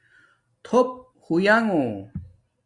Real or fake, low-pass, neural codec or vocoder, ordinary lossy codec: real; 10.8 kHz; none; Opus, 64 kbps